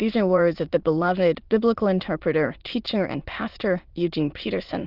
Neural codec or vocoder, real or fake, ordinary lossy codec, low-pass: autoencoder, 22.05 kHz, a latent of 192 numbers a frame, VITS, trained on many speakers; fake; Opus, 32 kbps; 5.4 kHz